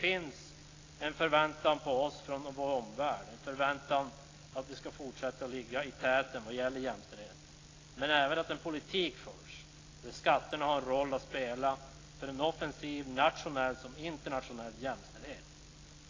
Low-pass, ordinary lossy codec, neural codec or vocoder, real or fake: 7.2 kHz; AAC, 32 kbps; none; real